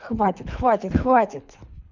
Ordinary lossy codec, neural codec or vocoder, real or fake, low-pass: none; codec, 24 kHz, 3 kbps, HILCodec; fake; 7.2 kHz